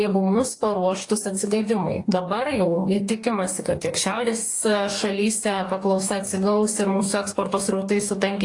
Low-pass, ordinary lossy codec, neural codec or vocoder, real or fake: 10.8 kHz; AAC, 48 kbps; codec, 44.1 kHz, 2.6 kbps, DAC; fake